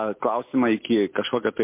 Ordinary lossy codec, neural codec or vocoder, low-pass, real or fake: MP3, 24 kbps; none; 3.6 kHz; real